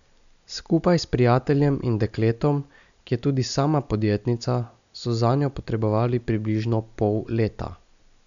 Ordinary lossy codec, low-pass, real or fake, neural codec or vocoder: none; 7.2 kHz; real; none